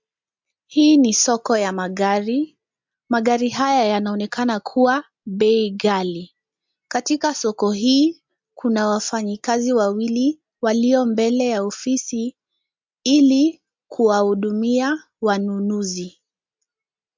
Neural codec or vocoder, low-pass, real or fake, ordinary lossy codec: none; 7.2 kHz; real; MP3, 64 kbps